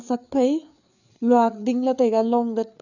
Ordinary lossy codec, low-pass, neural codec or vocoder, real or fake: none; 7.2 kHz; codec, 16 kHz, 4 kbps, FreqCodec, larger model; fake